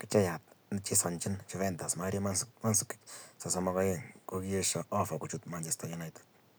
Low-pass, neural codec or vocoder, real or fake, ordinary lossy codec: none; none; real; none